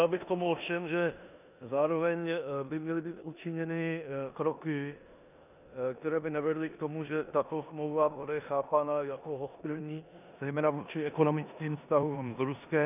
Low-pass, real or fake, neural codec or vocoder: 3.6 kHz; fake; codec, 16 kHz in and 24 kHz out, 0.9 kbps, LongCat-Audio-Codec, four codebook decoder